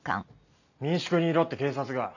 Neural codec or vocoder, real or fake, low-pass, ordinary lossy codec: none; real; 7.2 kHz; none